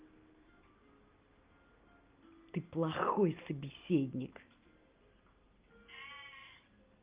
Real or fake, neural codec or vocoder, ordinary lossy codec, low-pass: real; none; Opus, 24 kbps; 3.6 kHz